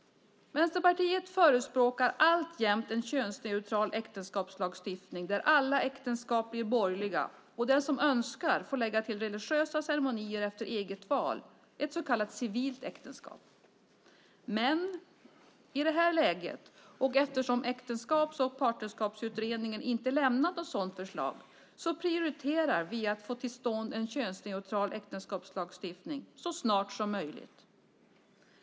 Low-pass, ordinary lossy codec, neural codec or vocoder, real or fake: none; none; none; real